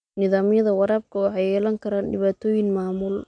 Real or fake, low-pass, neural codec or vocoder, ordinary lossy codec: real; 9.9 kHz; none; none